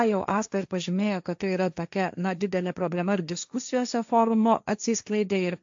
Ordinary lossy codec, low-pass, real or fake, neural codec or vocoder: AAC, 64 kbps; 7.2 kHz; fake; codec, 16 kHz, 1.1 kbps, Voila-Tokenizer